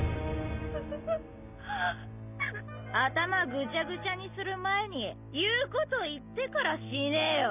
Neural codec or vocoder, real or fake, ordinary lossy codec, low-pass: none; real; none; 3.6 kHz